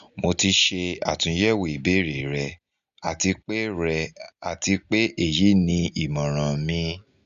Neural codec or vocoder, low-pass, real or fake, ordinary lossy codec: none; 7.2 kHz; real; Opus, 64 kbps